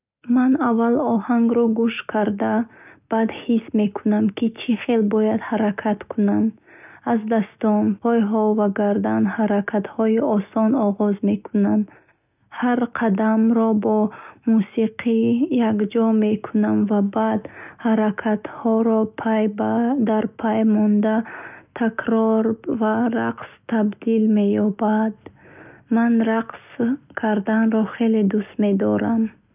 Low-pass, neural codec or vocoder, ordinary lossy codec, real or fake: 3.6 kHz; none; none; real